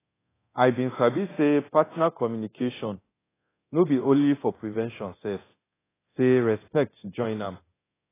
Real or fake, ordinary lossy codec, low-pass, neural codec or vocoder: fake; AAC, 16 kbps; 3.6 kHz; codec, 24 kHz, 0.9 kbps, DualCodec